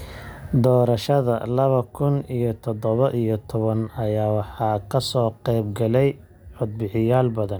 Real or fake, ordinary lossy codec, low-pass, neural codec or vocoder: real; none; none; none